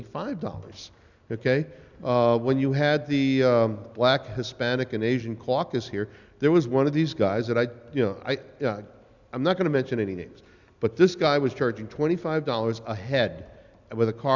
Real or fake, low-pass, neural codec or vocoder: real; 7.2 kHz; none